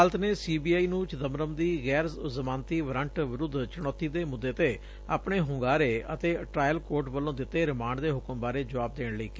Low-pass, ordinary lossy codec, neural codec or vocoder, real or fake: none; none; none; real